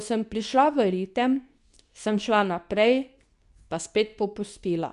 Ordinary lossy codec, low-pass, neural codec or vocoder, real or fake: none; 10.8 kHz; codec, 24 kHz, 0.9 kbps, WavTokenizer, medium speech release version 2; fake